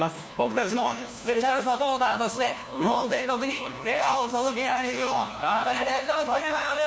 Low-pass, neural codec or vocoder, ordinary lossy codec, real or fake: none; codec, 16 kHz, 1 kbps, FunCodec, trained on LibriTTS, 50 frames a second; none; fake